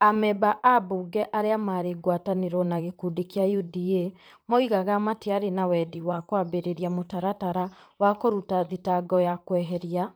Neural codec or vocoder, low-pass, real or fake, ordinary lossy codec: vocoder, 44.1 kHz, 128 mel bands, Pupu-Vocoder; none; fake; none